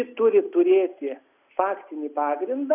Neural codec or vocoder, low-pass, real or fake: none; 3.6 kHz; real